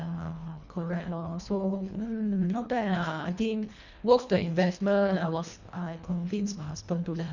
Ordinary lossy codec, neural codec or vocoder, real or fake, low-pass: none; codec, 24 kHz, 1.5 kbps, HILCodec; fake; 7.2 kHz